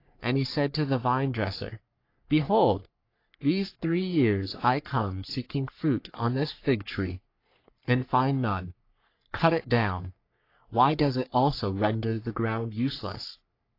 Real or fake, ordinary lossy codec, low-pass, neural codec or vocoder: fake; AAC, 32 kbps; 5.4 kHz; codec, 44.1 kHz, 3.4 kbps, Pupu-Codec